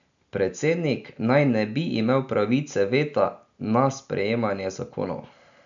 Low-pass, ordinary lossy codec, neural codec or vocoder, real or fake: 7.2 kHz; none; none; real